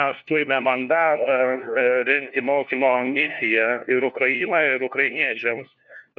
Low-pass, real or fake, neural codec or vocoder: 7.2 kHz; fake; codec, 16 kHz, 1 kbps, FunCodec, trained on LibriTTS, 50 frames a second